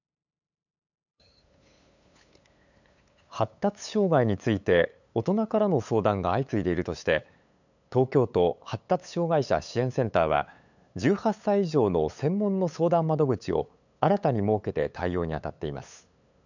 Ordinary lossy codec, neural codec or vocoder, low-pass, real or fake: none; codec, 16 kHz, 8 kbps, FunCodec, trained on LibriTTS, 25 frames a second; 7.2 kHz; fake